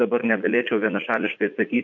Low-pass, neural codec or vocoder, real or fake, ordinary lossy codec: 7.2 kHz; vocoder, 44.1 kHz, 80 mel bands, Vocos; fake; MP3, 64 kbps